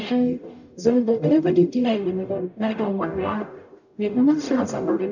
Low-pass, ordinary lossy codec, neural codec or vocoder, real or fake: 7.2 kHz; none; codec, 44.1 kHz, 0.9 kbps, DAC; fake